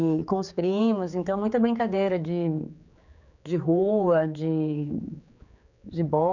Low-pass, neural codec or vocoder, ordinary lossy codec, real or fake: 7.2 kHz; codec, 16 kHz, 4 kbps, X-Codec, HuBERT features, trained on general audio; none; fake